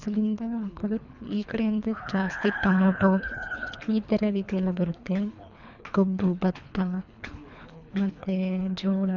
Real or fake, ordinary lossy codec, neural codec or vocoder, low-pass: fake; none; codec, 24 kHz, 3 kbps, HILCodec; 7.2 kHz